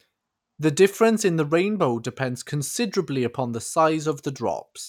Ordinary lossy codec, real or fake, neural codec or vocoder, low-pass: none; real; none; 19.8 kHz